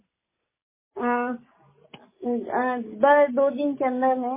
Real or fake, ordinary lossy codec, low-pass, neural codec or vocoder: real; MP3, 16 kbps; 3.6 kHz; none